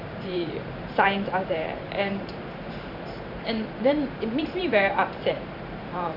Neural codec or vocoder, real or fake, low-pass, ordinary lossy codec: vocoder, 44.1 kHz, 128 mel bands every 512 samples, BigVGAN v2; fake; 5.4 kHz; none